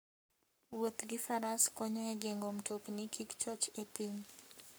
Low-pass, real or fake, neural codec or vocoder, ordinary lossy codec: none; fake; codec, 44.1 kHz, 7.8 kbps, Pupu-Codec; none